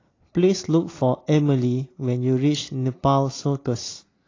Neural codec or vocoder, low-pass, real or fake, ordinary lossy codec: none; 7.2 kHz; real; AAC, 32 kbps